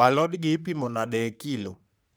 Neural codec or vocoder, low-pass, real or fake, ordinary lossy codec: codec, 44.1 kHz, 3.4 kbps, Pupu-Codec; none; fake; none